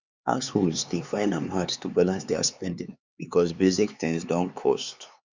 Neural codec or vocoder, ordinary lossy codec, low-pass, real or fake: codec, 16 kHz, 4 kbps, X-Codec, HuBERT features, trained on LibriSpeech; Opus, 64 kbps; 7.2 kHz; fake